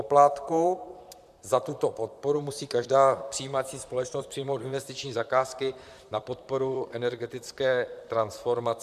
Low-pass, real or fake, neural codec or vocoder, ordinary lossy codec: 14.4 kHz; fake; vocoder, 44.1 kHz, 128 mel bands, Pupu-Vocoder; MP3, 96 kbps